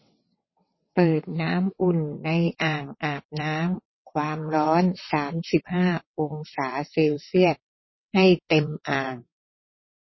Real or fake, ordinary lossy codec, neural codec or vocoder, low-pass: fake; MP3, 24 kbps; vocoder, 22.05 kHz, 80 mel bands, WaveNeXt; 7.2 kHz